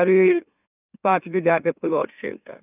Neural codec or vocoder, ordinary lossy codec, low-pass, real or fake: autoencoder, 44.1 kHz, a latent of 192 numbers a frame, MeloTTS; none; 3.6 kHz; fake